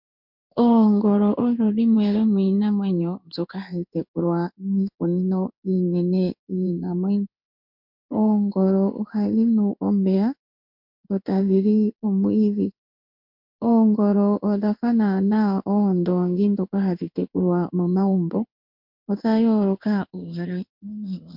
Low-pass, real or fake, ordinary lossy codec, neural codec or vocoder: 5.4 kHz; fake; MP3, 48 kbps; codec, 16 kHz in and 24 kHz out, 1 kbps, XY-Tokenizer